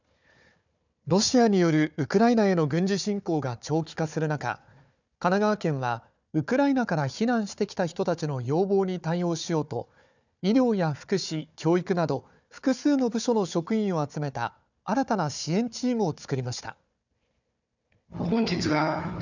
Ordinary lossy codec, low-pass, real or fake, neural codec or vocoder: none; 7.2 kHz; fake; codec, 16 kHz, 4 kbps, FunCodec, trained on Chinese and English, 50 frames a second